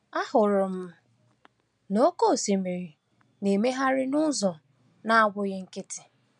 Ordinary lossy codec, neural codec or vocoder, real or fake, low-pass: none; none; real; 9.9 kHz